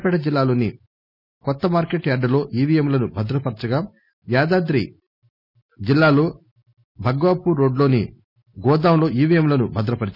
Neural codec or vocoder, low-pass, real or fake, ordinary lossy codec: none; 5.4 kHz; real; none